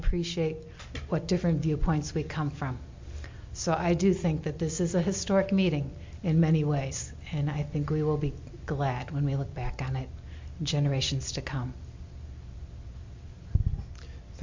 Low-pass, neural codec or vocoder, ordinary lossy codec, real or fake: 7.2 kHz; none; MP3, 48 kbps; real